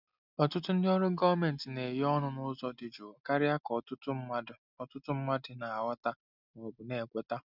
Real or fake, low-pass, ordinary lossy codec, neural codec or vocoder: real; 5.4 kHz; none; none